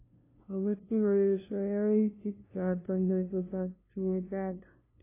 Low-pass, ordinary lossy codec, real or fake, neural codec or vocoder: 3.6 kHz; AAC, 24 kbps; fake; codec, 16 kHz, 0.5 kbps, FunCodec, trained on LibriTTS, 25 frames a second